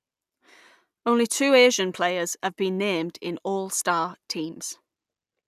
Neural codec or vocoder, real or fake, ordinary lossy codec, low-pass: none; real; none; 14.4 kHz